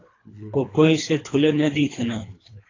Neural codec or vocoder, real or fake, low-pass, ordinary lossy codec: codec, 24 kHz, 3 kbps, HILCodec; fake; 7.2 kHz; AAC, 32 kbps